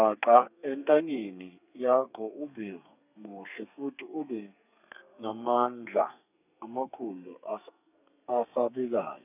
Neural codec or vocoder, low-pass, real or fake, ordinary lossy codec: codec, 32 kHz, 1.9 kbps, SNAC; 3.6 kHz; fake; none